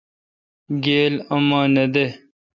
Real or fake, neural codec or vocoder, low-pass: real; none; 7.2 kHz